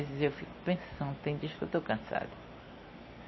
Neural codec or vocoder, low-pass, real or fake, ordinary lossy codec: none; 7.2 kHz; real; MP3, 24 kbps